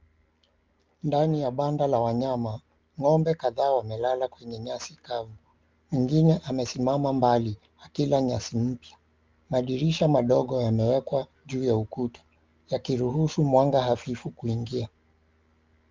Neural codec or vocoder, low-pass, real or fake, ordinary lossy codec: none; 7.2 kHz; real; Opus, 24 kbps